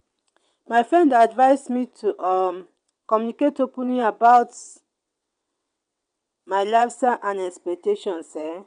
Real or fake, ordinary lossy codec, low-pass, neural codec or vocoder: fake; MP3, 96 kbps; 9.9 kHz; vocoder, 22.05 kHz, 80 mel bands, WaveNeXt